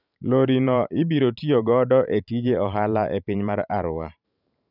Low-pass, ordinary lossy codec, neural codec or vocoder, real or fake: 5.4 kHz; none; vocoder, 44.1 kHz, 128 mel bands every 512 samples, BigVGAN v2; fake